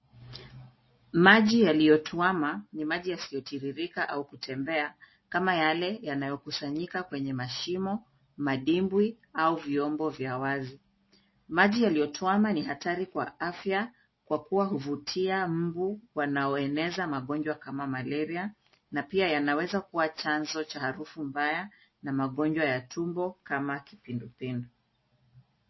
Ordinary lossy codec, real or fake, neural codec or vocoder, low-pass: MP3, 24 kbps; real; none; 7.2 kHz